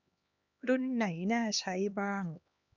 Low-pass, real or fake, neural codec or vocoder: 7.2 kHz; fake; codec, 16 kHz, 2 kbps, X-Codec, HuBERT features, trained on LibriSpeech